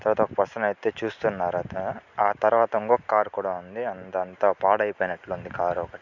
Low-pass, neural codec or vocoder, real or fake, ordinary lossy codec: 7.2 kHz; none; real; none